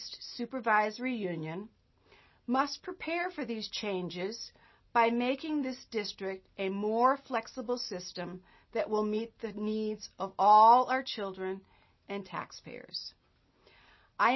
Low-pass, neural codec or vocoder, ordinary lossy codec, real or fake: 7.2 kHz; none; MP3, 24 kbps; real